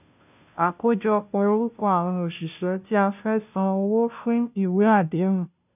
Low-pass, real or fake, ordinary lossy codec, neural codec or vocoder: 3.6 kHz; fake; none; codec, 16 kHz, 0.5 kbps, FunCodec, trained on Chinese and English, 25 frames a second